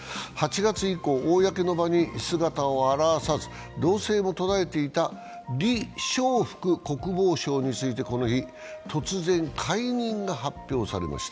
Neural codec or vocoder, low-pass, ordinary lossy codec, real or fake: none; none; none; real